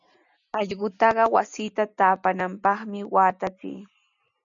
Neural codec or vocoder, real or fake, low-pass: none; real; 7.2 kHz